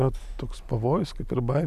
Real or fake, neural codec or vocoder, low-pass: real; none; 14.4 kHz